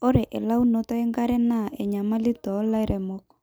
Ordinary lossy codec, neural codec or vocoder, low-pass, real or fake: none; none; none; real